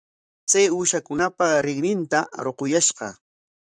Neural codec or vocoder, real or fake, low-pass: vocoder, 44.1 kHz, 128 mel bands, Pupu-Vocoder; fake; 9.9 kHz